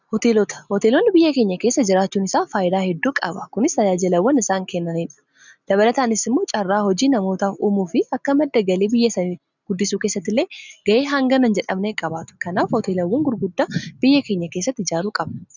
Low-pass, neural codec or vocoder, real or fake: 7.2 kHz; none; real